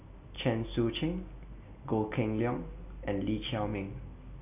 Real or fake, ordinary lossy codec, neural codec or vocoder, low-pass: real; none; none; 3.6 kHz